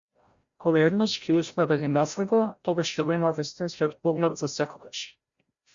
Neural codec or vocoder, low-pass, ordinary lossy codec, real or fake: codec, 16 kHz, 0.5 kbps, FreqCodec, larger model; 7.2 kHz; Opus, 64 kbps; fake